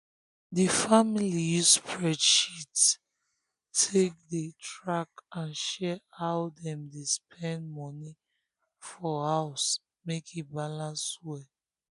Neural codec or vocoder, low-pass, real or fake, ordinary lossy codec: none; 10.8 kHz; real; none